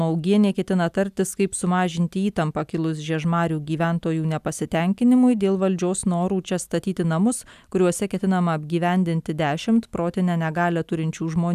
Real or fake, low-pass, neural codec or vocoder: real; 14.4 kHz; none